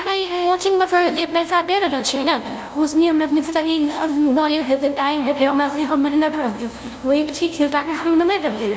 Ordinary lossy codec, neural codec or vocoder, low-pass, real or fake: none; codec, 16 kHz, 0.5 kbps, FunCodec, trained on LibriTTS, 25 frames a second; none; fake